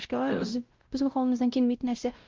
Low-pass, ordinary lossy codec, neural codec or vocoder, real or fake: 7.2 kHz; Opus, 32 kbps; codec, 16 kHz, 0.5 kbps, X-Codec, WavLM features, trained on Multilingual LibriSpeech; fake